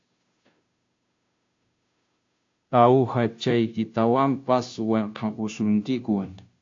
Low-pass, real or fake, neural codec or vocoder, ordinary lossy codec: 7.2 kHz; fake; codec, 16 kHz, 0.5 kbps, FunCodec, trained on Chinese and English, 25 frames a second; AAC, 48 kbps